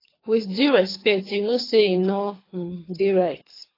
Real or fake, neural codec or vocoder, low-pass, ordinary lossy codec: fake; codec, 24 kHz, 3 kbps, HILCodec; 5.4 kHz; AAC, 24 kbps